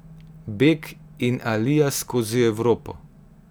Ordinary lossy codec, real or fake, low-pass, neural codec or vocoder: none; real; none; none